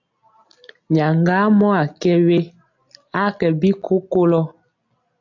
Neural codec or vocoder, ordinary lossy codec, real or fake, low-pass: none; AAC, 48 kbps; real; 7.2 kHz